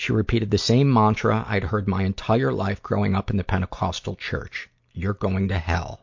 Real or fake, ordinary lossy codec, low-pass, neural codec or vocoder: real; MP3, 48 kbps; 7.2 kHz; none